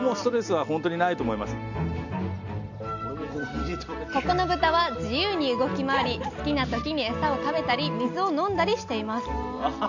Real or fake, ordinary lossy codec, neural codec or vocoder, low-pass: real; none; none; 7.2 kHz